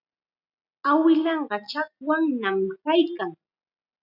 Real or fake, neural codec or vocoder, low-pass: real; none; 5.4 kHz